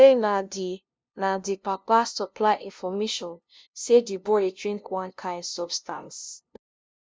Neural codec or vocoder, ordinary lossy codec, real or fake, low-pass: codec, 16 kHz, 0.5 kbps, FunCodec, trained on LibriTTS, 25 frames a second; none; fake; none